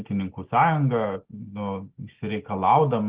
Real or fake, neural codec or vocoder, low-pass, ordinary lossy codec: real; none; 3.6 kHz; Opus, 16 kbps